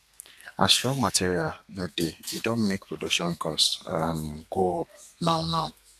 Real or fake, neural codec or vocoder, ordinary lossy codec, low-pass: fake; codec, 32 kHz, 1.9 kbps, SNAC; none; 14.4 kHz